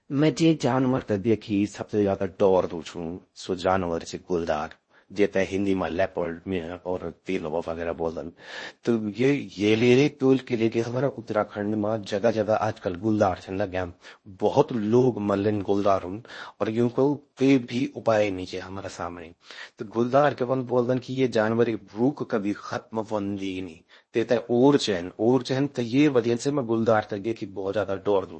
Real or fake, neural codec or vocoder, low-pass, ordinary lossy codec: fake; codec, 16 kHz in and 24 kHz out, 0.6 kbps, FocalCodec, streaming, 4096 codes; 9.9 kHz; MP3, 32 kbps